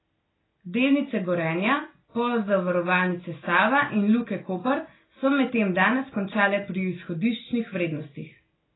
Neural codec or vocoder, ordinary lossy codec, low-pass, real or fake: none; AAC, 16 kbps; 7.2 kHz; real